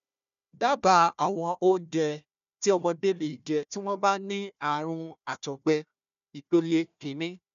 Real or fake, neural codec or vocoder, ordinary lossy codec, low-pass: fake; codec, 16 kHz, 1 kbps, FunCodec, trained on Chinese and English, 50 frames a second; none; 7.2 kHz